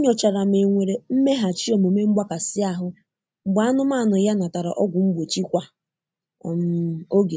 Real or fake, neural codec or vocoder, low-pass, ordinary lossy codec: real; none; none; none